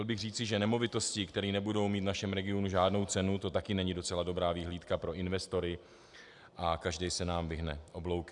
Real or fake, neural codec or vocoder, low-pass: real; none; 10.8 kHz